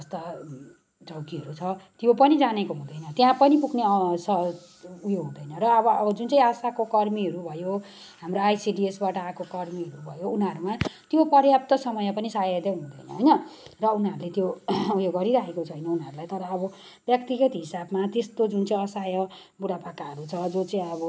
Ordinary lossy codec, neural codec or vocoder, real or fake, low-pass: none; none; real; none